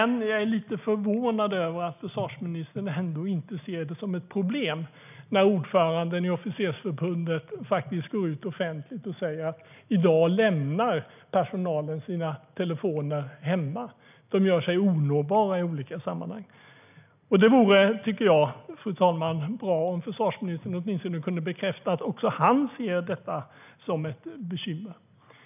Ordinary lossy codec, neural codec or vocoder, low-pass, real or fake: none; none; 3.6 kHz; real